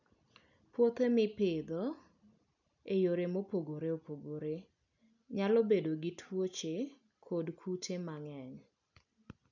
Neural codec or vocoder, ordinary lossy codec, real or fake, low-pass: none; none; real; 7.2 kHz